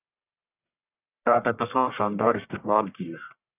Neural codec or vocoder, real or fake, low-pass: codec, 44.1 kHz, 1.7 kbps, Pupu-Codec; fake; 3.6 kHz